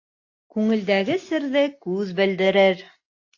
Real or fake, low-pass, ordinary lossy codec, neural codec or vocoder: real; 7.2 kHz; AAC, 48 kbps; none